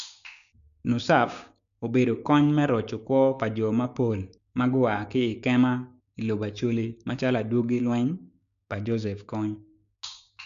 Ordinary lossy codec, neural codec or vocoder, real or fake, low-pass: none; codec, 16 kHz, 6 kbps, DAC; fake; 7.2 kHz